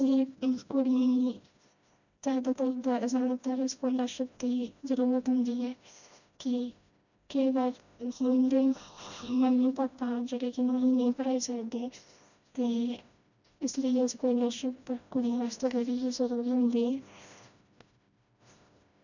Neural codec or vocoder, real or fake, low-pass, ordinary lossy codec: codec, 16 kHz, 1 kbps, FreqCodec, smaller model; fake; 7.2 kHz; none